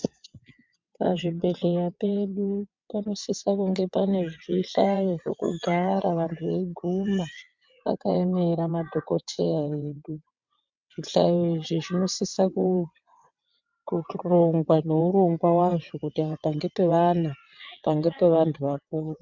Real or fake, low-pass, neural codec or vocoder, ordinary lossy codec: fake; 7.2 kHz; vocoder, 22.05 kHz, 80 mel bands, WaveNeXt; MP3, 64 kbps